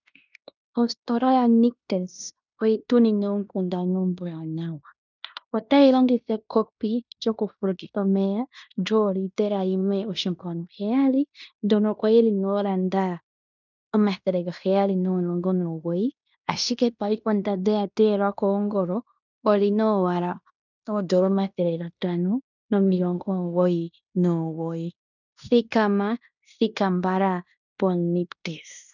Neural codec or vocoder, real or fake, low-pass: codec, 16 kHz in and 24 kHz out, 0.9 kbps, LongCat-Audio-Codec, fine tuned four codebook decoder; fake; 7.2 kHz